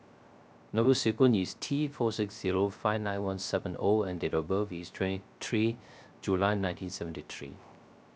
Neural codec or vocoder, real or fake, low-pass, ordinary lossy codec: codec, 16 kHz, 0.3 kbps, FocalCodec; fake; none; none